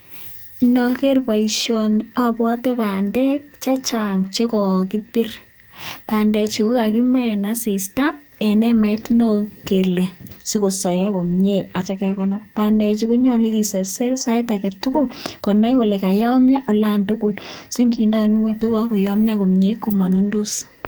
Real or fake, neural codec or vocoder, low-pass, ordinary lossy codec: fake; codec, 44.1 kHz, 2.6 kbps, SNAC; none; none